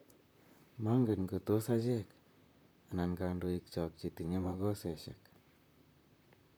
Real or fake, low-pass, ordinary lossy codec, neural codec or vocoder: fake; none; none; vocoder, 44.1 kHz, 128 mel bands, Pupu-Vocoder